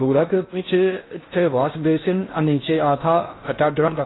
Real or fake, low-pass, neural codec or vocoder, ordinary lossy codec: fake; 7.2 kHz; codec, 16 kHz in and 24 kHz out, 0.6 kbps, FocalCodec, streaming, 4096 codes; AAC, 16 kbps